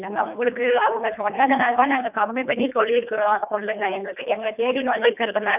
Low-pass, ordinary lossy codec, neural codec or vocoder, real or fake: 3.6 kHz; none; codec, 24 kHz, 1.5 kbps, HILCodec; fake